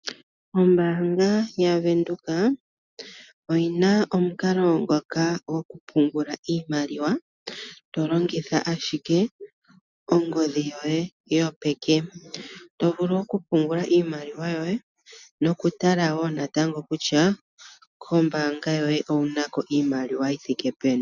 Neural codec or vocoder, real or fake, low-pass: none; real; 7.2 kHz